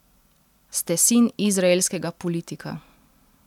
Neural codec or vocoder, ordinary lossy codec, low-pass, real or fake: none; none; 19.8 kHz; real